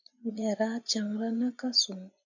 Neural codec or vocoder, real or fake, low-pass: vocoder, 24 kHz, 100 mel bands, Vocos; fake; 7.2 kHz